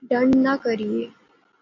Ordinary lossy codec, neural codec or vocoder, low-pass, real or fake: MP3, 48 kbps; none; 7.2 kHz; real